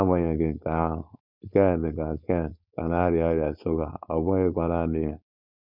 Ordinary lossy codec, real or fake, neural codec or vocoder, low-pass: none; fake; codec, 16 kHz, 4.8 kbps, FACodec; 5.4 kHz